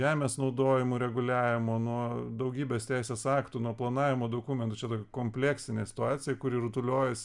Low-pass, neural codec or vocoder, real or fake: 10.8 kHz; none; real